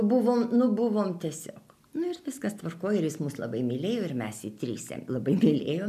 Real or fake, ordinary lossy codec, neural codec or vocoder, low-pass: real; MP3, 96 kbps; none; 14.4 kHz